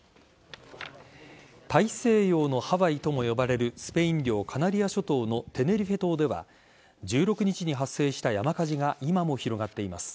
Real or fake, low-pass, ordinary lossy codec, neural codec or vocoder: real; none; none; none